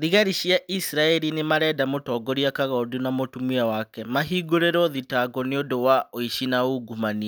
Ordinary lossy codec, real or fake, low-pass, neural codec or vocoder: none; real; none; none